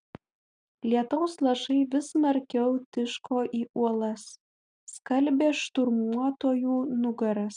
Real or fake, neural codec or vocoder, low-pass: real; none; 9.9 kHz